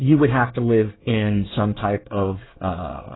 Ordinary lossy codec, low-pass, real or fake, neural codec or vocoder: AAC, 16 kbps; 7.2 kHz; fake; codec, 24 kHz, 1 kbps, SNAC